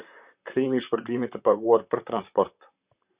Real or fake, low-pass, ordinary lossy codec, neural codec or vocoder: fake; 3.6 kHz; Opus, 64 kbps; vocoder, 44.1 kHz, 128 mel bands, Pupu-Vocoder